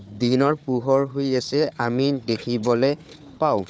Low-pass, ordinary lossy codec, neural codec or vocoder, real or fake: none; none; codec, 16 kHz, 4 kbps, FunCodec, trained on Chinese and English, 50 frames a second; fake